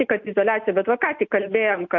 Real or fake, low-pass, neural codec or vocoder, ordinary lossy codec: real; 7.2 kHz; none; AAC, 48 kbps